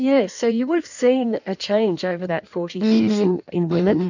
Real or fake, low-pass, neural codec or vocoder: fake; 7.2 kHz; codec, 16 kHz in and 24 kHz out, 1.1 kbps, FireRedTTS-2 codec